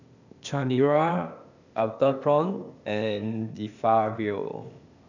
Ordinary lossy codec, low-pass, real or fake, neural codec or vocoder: none; 7.2 kHz; fake; codec, 16 kHz, 0.8 kbps, ZipCodec